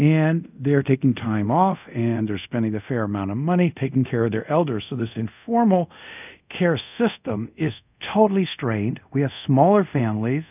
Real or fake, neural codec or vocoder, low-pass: fake; codec, 24 kHz, 0.5 kbps, DualCodec; 3.6 kHz